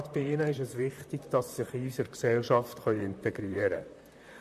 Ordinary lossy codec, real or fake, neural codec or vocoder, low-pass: MP3, 96 kbps; fake; vocoder, 44.1 kHz, 128 mel bands, Pupu-Vocoder; 14.4 kHz